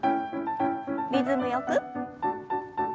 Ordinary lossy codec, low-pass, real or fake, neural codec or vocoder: none; none; real; none